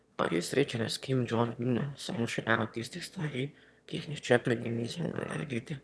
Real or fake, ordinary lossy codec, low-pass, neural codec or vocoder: fake; none; none; autoencoder, 22.05 kHz, a latent of 192 numbers a frame, VITS, trained on one speaker